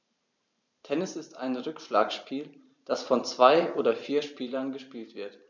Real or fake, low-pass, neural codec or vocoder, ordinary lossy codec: fake; 7.2 kHz; vocoder, 44.1 kHz, 128 mel bands every 512 samples, BigVGAN v2; none